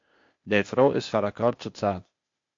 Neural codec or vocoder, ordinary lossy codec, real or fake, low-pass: codec, 16 kHz, 0.8 kbps, ZipCodec; MP3, 48 kbps; fake; 7.2 kHz